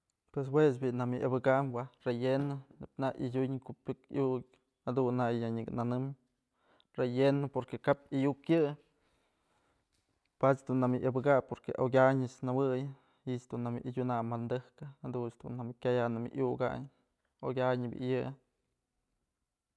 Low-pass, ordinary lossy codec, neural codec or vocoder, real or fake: none; none; none; real